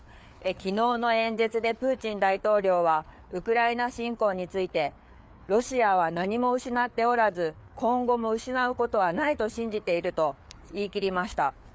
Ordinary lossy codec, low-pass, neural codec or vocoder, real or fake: none; none; codec, 16 kHz, 4 kbps, FreqCodec, larger model; fake